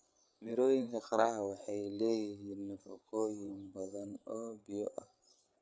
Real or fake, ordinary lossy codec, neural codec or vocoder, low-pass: fake; none; codec, 16 kHz, 8 kbps, FreqCodec, larger model; none